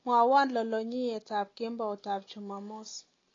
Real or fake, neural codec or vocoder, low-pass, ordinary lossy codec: real; none; 7.2 kHz; AAC, 32 kbps